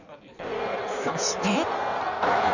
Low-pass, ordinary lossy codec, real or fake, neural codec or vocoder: 7.2 kHz; none; fake; codec, 16 kHz in and 24 kHz out, 1.1 kbps, FireRedTTS-2 codec